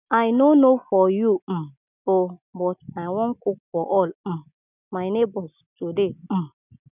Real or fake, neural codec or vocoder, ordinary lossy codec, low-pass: real; none; none; 3.6 kHz